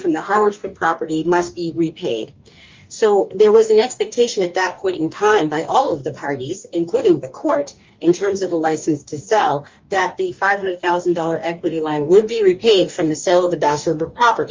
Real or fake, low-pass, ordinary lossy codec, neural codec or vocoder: fake; 7.2 kHz; Opus, 32 kbps; codec, 44.1 kHz, 2.6 kbps, DAC